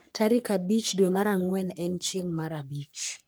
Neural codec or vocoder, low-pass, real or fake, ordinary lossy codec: codec, 44.1 kHz, 3.4 kbps, Pupu-Codec; none; fake; none